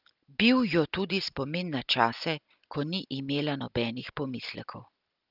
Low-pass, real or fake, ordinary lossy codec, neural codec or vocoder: 5.4 kHz; real; Opus, 24 kbps; none